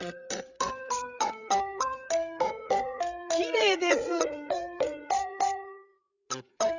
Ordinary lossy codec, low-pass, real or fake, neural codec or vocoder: Opus, 64 kbps; 7.2 kHz; fake; codec, 16 kHz, 8 kbps, FreqCodec, larger model